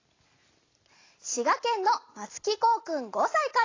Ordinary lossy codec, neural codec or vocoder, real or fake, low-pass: AAC, 32 kbps; none; real; 7.2 kHz